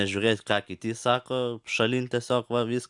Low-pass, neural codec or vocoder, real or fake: 10.8 kHz; none; real